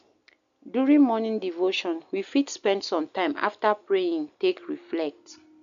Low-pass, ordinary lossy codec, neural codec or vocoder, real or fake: 7.2 kHz; none; none; real